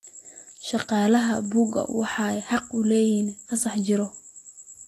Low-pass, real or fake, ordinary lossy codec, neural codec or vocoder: 14.4 kHz; real; AAC, 48 kbps; none